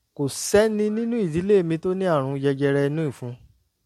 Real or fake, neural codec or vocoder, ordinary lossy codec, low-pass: real; none; MP3, 64 kbps; 19.8 kHz